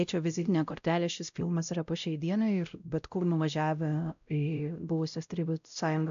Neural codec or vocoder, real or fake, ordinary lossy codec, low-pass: codec, 16 kHz, 0.5 kbps, X-Codec, WavLM features, trained on Multilingual LibriSpeech; fake; AAC, 96 kbps; 7.2 kHz